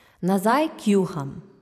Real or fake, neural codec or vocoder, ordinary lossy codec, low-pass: fake; vocoder, 44.1 kHz, 128 mel bands every 512 samples, BigVGAN v2; none; 14.4 kHz